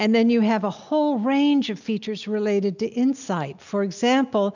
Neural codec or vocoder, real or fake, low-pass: none; real; 7.2 kHz